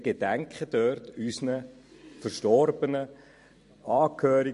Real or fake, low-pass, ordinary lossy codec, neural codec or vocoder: fake; 14.4 kHz; MP3, 48 kbps; vocoder, 44.1 kHz, 128 mel bands every 256 samples, BigVGAN v2